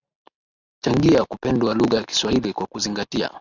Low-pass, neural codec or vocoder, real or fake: 7.2 kHz; none; real